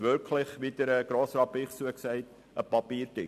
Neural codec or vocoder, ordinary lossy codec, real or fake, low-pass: none; MP3, 96 kbps; real; 14.4 kHz